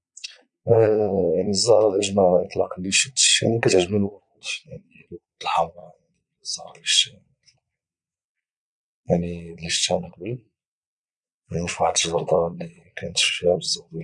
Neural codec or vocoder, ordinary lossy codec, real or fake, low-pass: vocoder, 22.05 kHz, 80 mel bands, Vocos; none; fake; 9.9 kHz